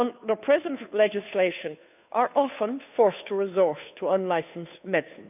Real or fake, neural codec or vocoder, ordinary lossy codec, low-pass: fake; codec, 16 kHz, 2 kbps, FunCodec, trained on Chinese and English, 25 frames a second; none; 3.6 kHz